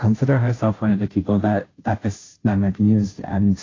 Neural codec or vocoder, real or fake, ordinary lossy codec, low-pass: codec, 16 kHz, 0.5 kbps, FunCodec, trained on Chinese and English, 25 frames a second; fake; AAC, 32 kbps; 7.2 kHz